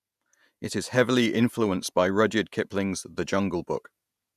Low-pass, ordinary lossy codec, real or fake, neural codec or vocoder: 14.4 kHz; none; fake; vocoder, 48 kHz, 128 mel bands, Vocos